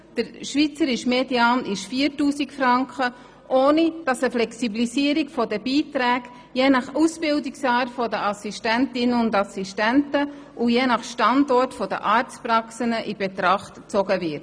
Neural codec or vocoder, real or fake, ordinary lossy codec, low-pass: none; real; none; none